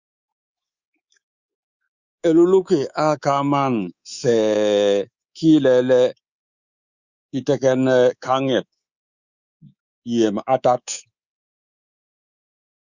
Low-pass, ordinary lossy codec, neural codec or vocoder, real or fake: 7.2 kHz; Opus, 64 kbps; codec, 24 kHz, 3.1 kbps, DualCodec; fake